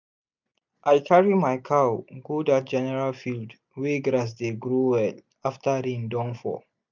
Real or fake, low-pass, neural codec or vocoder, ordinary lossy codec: real; 7.2 kHz; none; none